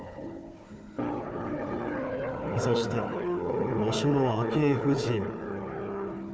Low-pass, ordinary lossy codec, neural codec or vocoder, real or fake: none; none; codec, 16 kHz, 4 kbps, FunCodec, trained on Chinese and English, 50 frames a second; fake